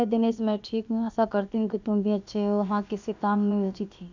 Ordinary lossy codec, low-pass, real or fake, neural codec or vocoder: none; 7.2 kHz; fake; codec, 16 kHz, about 1 kbps, DyCAST, with the encoder's durations